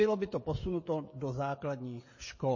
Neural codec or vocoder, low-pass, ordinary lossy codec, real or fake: codec, 16 kHz, 16 kbps, FreqCodec, smaller model; 7.2 kHz; MP3, 32 kbps; fake